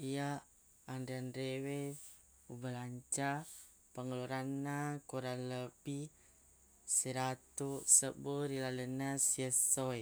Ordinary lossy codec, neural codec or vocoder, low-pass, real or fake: none; none; none; real